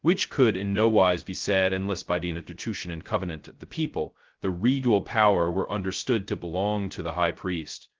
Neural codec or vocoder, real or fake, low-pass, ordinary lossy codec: codec, 16 kHz, 0.2 kbps, FocalCodec; fake; 7.2 kHz; Opus, 16 kbps